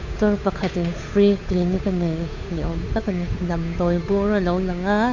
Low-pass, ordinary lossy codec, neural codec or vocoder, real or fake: 7.2 kHz; MP3, 48 kbps; codec, 16 kHz, 8 kbps, FunCodec, trained on Chinese and English, 25 frames a second; fake